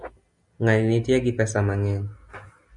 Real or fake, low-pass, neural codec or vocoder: real; 10.8 kHz; none